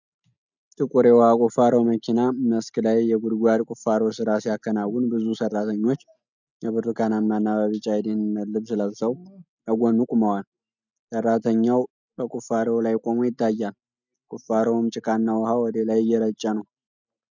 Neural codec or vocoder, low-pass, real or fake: none; 7.2 kHz; real